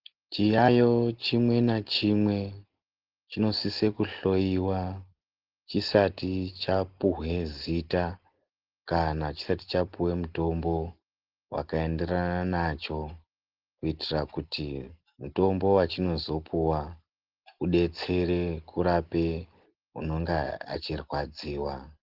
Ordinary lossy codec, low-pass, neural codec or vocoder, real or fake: Opus, 16 kbps; 5.4 kHz; none; real